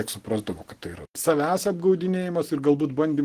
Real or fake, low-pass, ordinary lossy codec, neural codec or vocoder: real; 14.4 kHz; Opus, 16 kbps; none